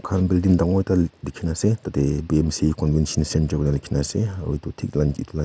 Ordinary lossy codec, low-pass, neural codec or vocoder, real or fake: none; none; none; real